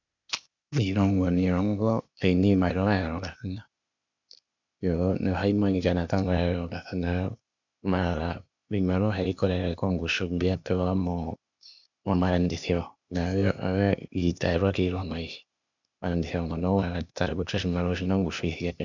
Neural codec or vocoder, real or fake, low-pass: codec, 16 kHz, 0.8 kbps, ZipCodec; fake; 7.2 kHz